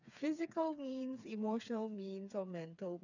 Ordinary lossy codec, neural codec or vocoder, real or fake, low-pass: none; codec, 16 kHz, 4 kbps, FreqCodec, smaller model; fake; 7.2 kHz